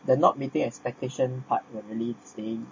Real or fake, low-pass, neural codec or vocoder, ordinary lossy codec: real; 7.2 kHz; none; MP3, 32 kbps